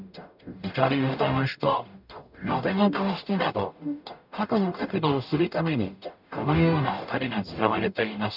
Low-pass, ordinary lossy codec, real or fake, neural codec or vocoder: 5.4 kHz; none; fake; codec, 44.1 kHz, 0.9 kbps, DAC